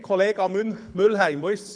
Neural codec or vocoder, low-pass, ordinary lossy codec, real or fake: codec, 24 kHz, 6 kbps, HILCodec; 9.9 kHz; none; fake